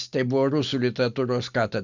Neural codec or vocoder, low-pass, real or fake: none; 7.2 kHz; real